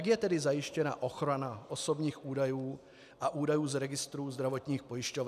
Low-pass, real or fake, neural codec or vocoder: 14.4 kHz; real; none